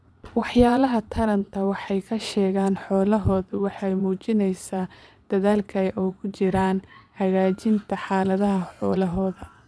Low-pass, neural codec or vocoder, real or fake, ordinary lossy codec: none; vocoder, 22.05 kHz, 80 mel bands, Vocos; fake; none